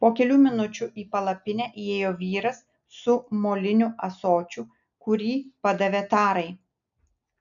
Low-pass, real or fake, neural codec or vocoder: 7.2 kHz; real; none